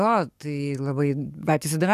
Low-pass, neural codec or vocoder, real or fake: 14.4 kHz; codec, 44.1 kHz, 7.8 kbps, DAC; fake